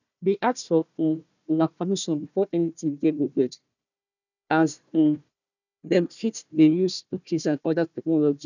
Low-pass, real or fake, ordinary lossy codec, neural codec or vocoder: 7.2 kHz; fake; none; codec, 16 kHz, 1 kbps, FunCodec, trained on Chinese and English, 50 frames a second